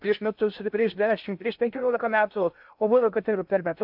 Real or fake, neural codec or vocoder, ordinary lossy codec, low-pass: fake; codec, 16 kHz in and 24 kHz out, 0.6 kbps, FocalCodec, streaming, 2048 codes; AAC, 48 kbps; 5.4 kHz